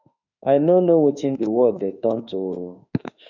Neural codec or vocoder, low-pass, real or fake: autoencoder, 48 kHz, 32 numbers a frame, DAC-VAE, trained on Japanese speech; 7.2 kHz; fake